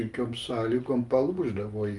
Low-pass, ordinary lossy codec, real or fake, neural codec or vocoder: 10.8 kHz; Opus, 32 kbps; real; none